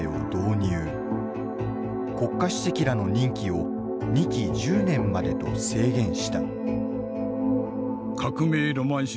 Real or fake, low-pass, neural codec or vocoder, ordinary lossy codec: real; none; none; none